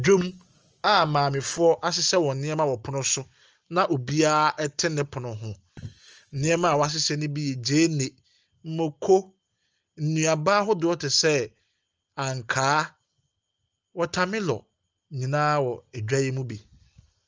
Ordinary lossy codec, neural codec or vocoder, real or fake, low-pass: Opus, 24 kbps; none; real; 7.2 kHz